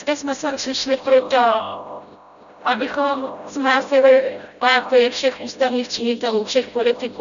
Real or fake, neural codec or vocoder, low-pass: fake; codec, 16 kHz, 0.5 kbps, FreqCodec, smaller model; 7.2 kHz